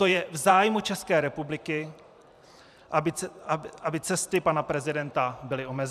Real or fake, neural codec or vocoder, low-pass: fake; vocoder, 44.1 kHz, 128 mel bands every 512 samples, BigVGAN v2; 14.4 kHz